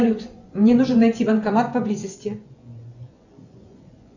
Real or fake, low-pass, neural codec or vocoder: fake; 7.2 kHz; vocoder, 24 kHz, 100 mel bands, Vocos